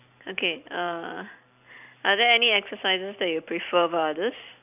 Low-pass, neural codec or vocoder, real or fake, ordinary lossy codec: 3.6 kHz; vocoder, 44.1 kHz, 128 mel bands every 256 samples, BigVGAN v2; fake; AAC, 32 kbps